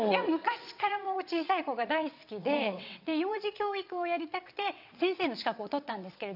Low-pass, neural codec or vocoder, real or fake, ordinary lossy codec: 5.4 kHz; vocoder, 22.05 kHz, 80 mel bands, WaveNeXt; fake; none